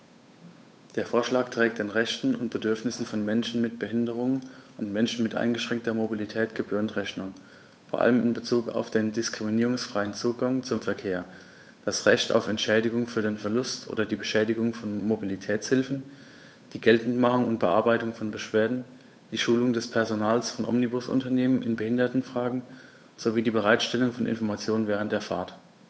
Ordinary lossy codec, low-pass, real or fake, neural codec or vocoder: none; none; fake; codec, 16 kHz, 8 kbps, FunCodec, trained on Chinese and English, 25 frames a second